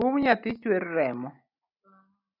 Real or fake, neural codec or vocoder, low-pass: real; none; 5.4 kHz